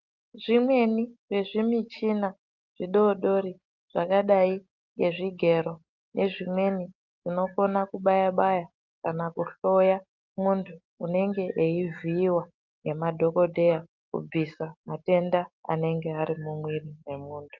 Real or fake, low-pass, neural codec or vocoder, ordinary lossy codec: real; 7.2 kHz; none; Opus, 32 kbps